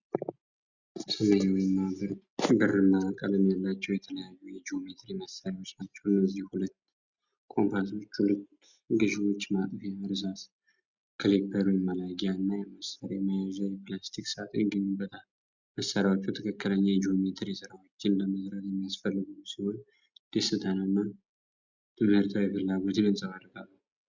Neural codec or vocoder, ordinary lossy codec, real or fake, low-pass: none; Opus, 64 kbps; real; 7.2 kHz